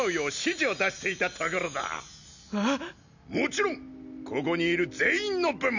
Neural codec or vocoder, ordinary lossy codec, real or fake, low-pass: none; none; real; 7.2 kHz